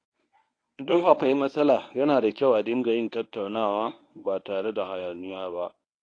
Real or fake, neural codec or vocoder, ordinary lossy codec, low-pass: fake; codec, 24 kHz, 0.9 kbps, WavTokenizer, medium speech release version 1; AAC, 48 kbps; 9.9 kHz